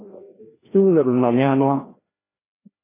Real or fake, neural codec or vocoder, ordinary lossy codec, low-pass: fake; codec, 16 kHz, 1 kbps, FreqCodec, larger model; AAC, 16 kbps; 3.6 kHz